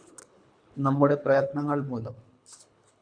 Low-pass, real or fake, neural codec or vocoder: 9.9 kHz; fake; codec, 24 kHz, 3 kbps, HILCodec